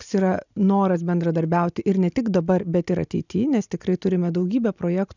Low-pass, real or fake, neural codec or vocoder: 7.2 kHz; real; none